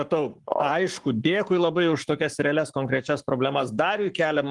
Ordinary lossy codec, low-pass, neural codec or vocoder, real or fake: Opus, 24 kbps; 10.8 kHz; codec, 44.1 kHz, 7.8 kbps, Pupu-Codec; fake